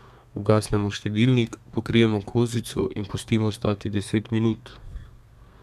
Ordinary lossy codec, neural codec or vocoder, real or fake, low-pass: none; codec, 32 kHz, 1.9 kbps, SNAC; fake; 14.4 kHz